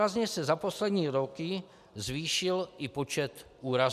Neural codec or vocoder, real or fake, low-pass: none; real; 14.4 kHz